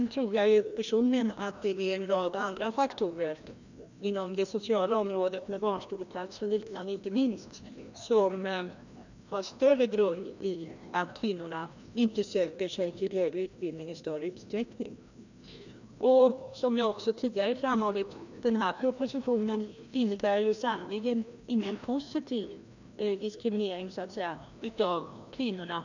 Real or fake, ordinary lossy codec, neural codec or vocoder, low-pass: fake; none; codec, 16 kHz, 1 kbps, FreqCodec, larger model; 7.2 kHz